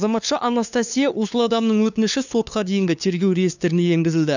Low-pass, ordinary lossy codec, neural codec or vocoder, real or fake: 7.2 kHz; none; codec, 16 kHz, 2 kbps, FunCodec, trained on LibriTTS, 25 frames a second; fake